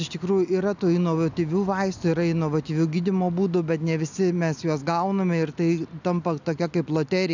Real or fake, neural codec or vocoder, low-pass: real; none; 7.2 kHz